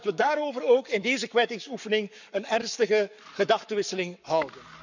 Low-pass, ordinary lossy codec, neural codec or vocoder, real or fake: 7.2 kHz; none; codec, 44.1 kHz, 7.8 kbps, Pupu-Codec; fake